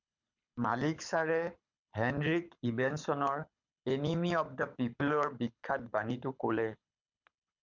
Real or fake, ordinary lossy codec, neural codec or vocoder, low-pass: fake; MP3, 64 kbps; codec, 24 kHz, 6 kbps, HILCodec; 7.2 kHz